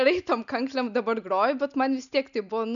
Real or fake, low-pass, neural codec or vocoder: real; 7.2 kHz; none